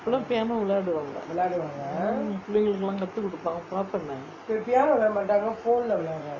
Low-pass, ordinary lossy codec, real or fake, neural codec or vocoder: 7.2 kHz; none; real; none